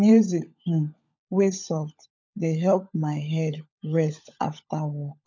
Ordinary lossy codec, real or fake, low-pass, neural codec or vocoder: none; fake; 7.2 kHz; codec, 16 kHz, 16 kbps, FunCodec, trained on LibriTTS, 50 frames a second